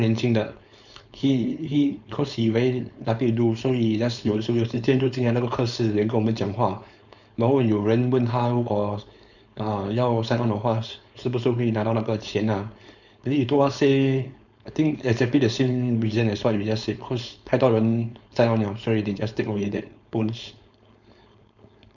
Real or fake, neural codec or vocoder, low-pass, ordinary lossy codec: fake; codec, 16 kHz, 4.8 kbps, FACodec; 7.2 kHz; none